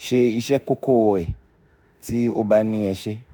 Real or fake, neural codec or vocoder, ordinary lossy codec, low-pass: fake; autoencoder, 48 kHz, 32 numbers a frame, DAC-VAE, trained on Japanese speech; none; none